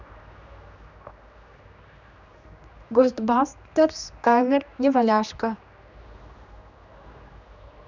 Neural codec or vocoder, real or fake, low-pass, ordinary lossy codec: codec, 16 kHz, 2 kbps, X-Codec, HuBERT features, trained on general audio; fake; 7.2 kHz; none